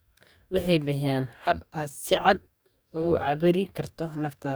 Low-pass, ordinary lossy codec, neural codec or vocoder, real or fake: none; none; codec, 44.1 kHz, 2.6 kbps, DAC; fake